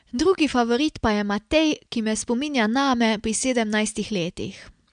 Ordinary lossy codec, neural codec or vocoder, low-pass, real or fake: MP3, 96 kbps; none; 9.9 kHz; real